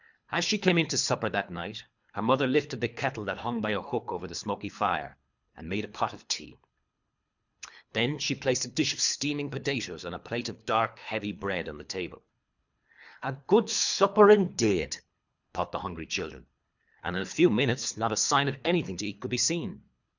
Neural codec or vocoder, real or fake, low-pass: codec, 24 kHz, 3 kbps, HILCodec; fake; 7.2 kHz